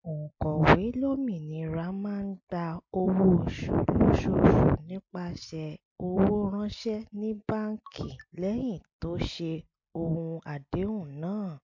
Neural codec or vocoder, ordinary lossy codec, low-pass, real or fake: none; MP3, 48 kbps; 7.2 kHz; real